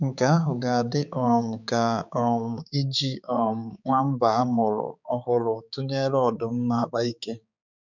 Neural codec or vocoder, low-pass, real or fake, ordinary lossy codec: codec, 16 kHz, 4 kbps, X-Codec, HuBERT features, trained on balanced general audio; 7.2 kHz; fake; none